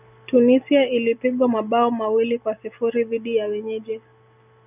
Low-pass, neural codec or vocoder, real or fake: 3.6 kHz; none; real